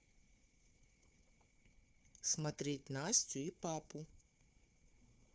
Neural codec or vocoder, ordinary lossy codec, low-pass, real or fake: codec, 16 kHz, 4 kbps, FunCodec, trained on Chinese and English, 50 frames a second; none; none; fake